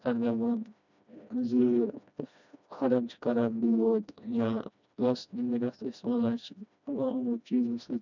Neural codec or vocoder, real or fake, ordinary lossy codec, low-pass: codec, 16 kHz, 1 kbps, FreqCodec, smaller model; fake; none; 7.2 kHz